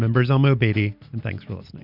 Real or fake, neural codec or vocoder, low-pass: real; none; 5.4 kHz